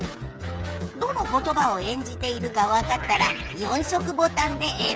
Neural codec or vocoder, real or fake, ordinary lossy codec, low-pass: codec, 16 kHz, 8 kbps, FreqCodec, smaller model; fake; none; none